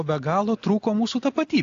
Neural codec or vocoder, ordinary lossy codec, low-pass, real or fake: none; AAC, 48 kbps; 7.2 kHz; real